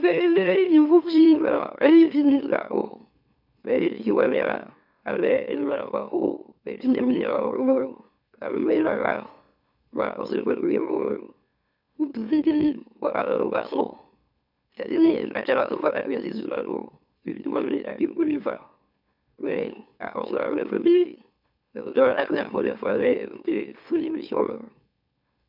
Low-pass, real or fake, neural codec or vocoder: 5.4 kHz; fake; autoencoder, 44.1 kHz, a latent of 192 numbers a frame, MeloTTS